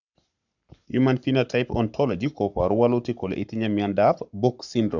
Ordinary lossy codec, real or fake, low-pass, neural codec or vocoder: none; fake; 7.2 kHz; codec, 44.1 kHz, 7.8 kbps, Pupu-Codec